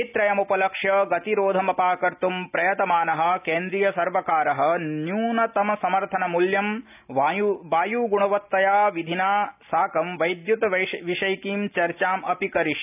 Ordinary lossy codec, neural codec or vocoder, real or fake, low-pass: none; none; real; 3.6 kHz